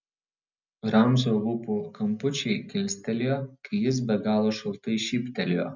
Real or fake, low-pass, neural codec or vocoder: real; 7.2 kHz; none